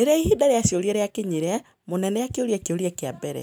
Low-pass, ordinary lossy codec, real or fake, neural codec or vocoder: none; none; real; none